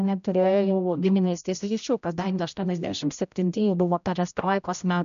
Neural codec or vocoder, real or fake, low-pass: codec, 16 kHz, 0.5 kbps, X-Codec, HuBERT features, trained on general audio; fake; 7.2 kHz